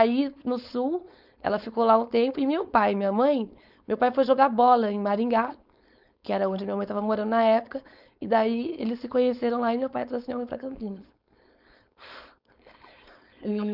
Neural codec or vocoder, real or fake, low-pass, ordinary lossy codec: codec, 16 kHz, 4.8 kbps, FACodec; fake; 5.4 kHz; none